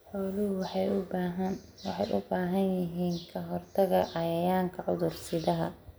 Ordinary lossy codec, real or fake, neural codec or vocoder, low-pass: none; real; none; none